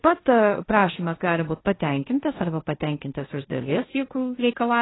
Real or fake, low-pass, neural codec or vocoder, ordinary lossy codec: fake; 7.2 kHz; codec, 16 kHz, 1.1 kbps, Voila-Tokenizer; AAC, 16 kbps